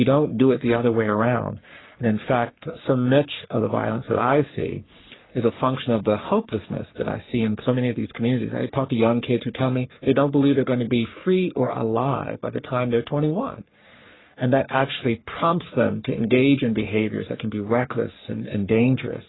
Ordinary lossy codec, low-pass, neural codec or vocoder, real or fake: AAC, 16 kbps; 7.2 kHz; codec, 44.1 kHz, 3.4 kbps, Pupu-Codec; fake